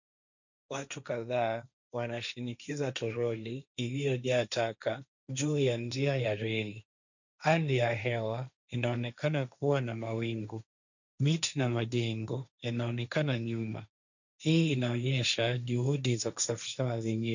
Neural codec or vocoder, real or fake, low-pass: codec, 16 kHz, 1.1 kbps, Voila-Tokenizer; fake; 7.2 kHz